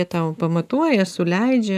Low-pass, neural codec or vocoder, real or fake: 14.4 kHz; none; real